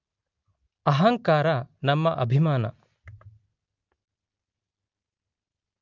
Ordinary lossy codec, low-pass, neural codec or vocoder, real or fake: Opus, 24 kbps; 7.2 kHz; none; real